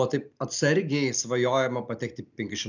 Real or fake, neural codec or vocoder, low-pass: real; none; 7.2 kHz